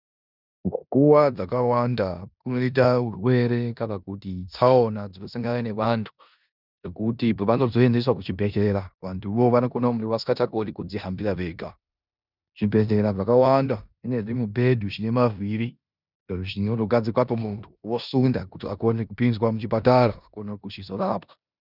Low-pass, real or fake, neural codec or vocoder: 5.4 kHz; fake; codec, 16 kHz in and 24 kHz out, 0.9 kbps, LongCat-Audio-Codec, four codebook decoder